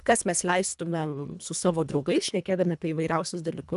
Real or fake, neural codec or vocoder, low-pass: fake; codec, 24 kHz, 1.5 kbps, HILCodec; 10.8 kHz